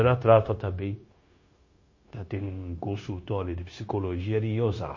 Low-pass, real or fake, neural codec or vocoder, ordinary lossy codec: 7.2 kHz; fake; codec, 16 kHz, 0.9 kbps, LongCat-Audio-Codec; MP3, 32 kbps